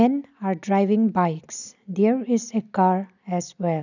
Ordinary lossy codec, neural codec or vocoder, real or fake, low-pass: none; none; real; 7.2 kHz